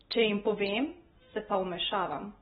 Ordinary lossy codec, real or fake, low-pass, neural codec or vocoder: AAC, 16 kbps; real; 14.4 kHz; none